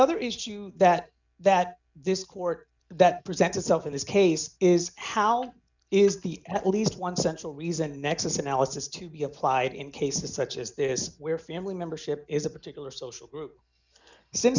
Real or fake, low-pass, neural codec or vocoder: real; 7.2 kHz; none